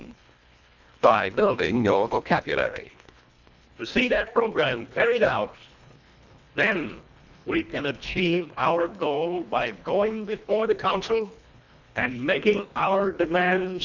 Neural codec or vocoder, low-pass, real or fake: codec, 24 kHz, 1.5 kbps, HILCodec; 7.2 kHz; fake